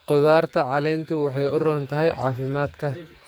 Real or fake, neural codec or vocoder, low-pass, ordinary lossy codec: fake; codec, 44.1 kHz, 2.6 kbps, SNAC; none; none